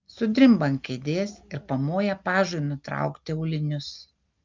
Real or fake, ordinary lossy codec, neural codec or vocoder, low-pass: real; Opus, 24 kbps; none; 7.2 kHz